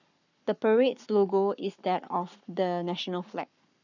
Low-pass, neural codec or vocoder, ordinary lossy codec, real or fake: 7.2 kHz; codec, 44.1 kHz, 7.8 kbps, Pupu-Codec; none; fake